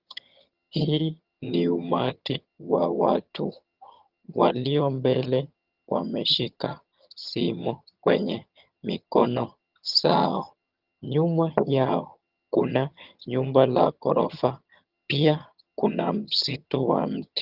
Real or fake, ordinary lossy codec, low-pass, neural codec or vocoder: fake; Opus, 32 kbps; 5.4 kHz; vocoder, 22.05 kHz, 80 mel bands, HiFi-GAN